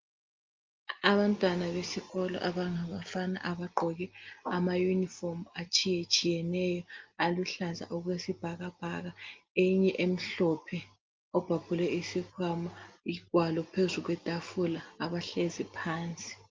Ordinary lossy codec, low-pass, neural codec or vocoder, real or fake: Opus, 32 kbps; 7.2 kHz; none; real